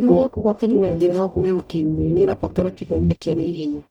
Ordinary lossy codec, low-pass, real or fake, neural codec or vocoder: none; 19.8 kHz; fake; codec, 44.1 kHz, 0.9 kbps, DAC